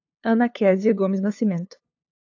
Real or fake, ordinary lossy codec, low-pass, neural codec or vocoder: fake; AAC, 48 kbps; 7.2 kHz; codec, 16 kHz, 2 kbps, FunCodec, trained on LibriTTS, 25 frames a second